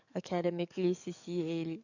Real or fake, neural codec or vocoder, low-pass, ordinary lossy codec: fake; codec, 44.1 kHz, 7.8 kbps, DAC; 7.2 kHz; none